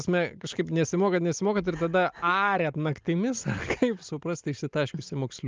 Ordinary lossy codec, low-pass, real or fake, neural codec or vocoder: Opus, 24 kbps; 7.2 kHz; real; none